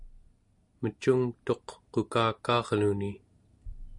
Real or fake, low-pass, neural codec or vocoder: real; 10.8 kHz; none